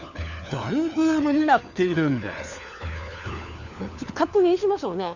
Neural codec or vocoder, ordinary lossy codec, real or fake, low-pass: codec, 16 kHz, 4 kbps, FunCodec, trained on LibriTTS, 50 frames a second; none; fake; 7.2 kHz